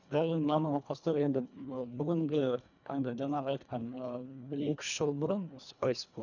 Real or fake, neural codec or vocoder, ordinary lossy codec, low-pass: fake; codec, 24 kHz, 1.5 kbps, HILCodec; none; 7.2 kHz